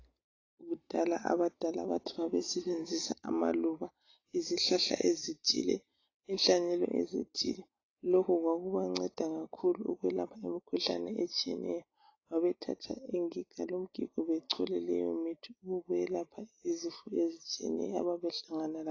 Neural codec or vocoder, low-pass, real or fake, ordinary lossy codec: none; 7.2 kHz; real; AAC, 32 kbps